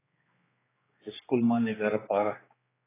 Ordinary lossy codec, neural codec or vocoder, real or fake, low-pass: AAC, 16 kbps; codec, 16 kHz, 2 kbps, X-Codec, HuBERT features, trained on general audio; fake; 3.6 kHz